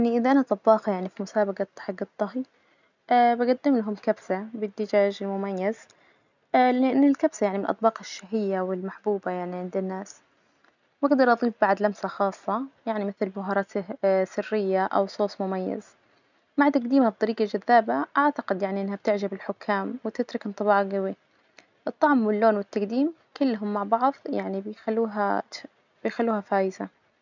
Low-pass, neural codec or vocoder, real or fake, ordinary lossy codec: 7.2 kHz; none; real; none